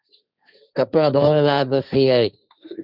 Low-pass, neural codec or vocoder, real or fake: 5.4 kHz; codec, 16 kHz, 1.1 kbps, Voila-Tokenizer; fake